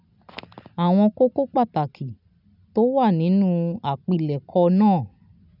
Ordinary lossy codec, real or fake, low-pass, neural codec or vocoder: none; real; 5.4 kHz; none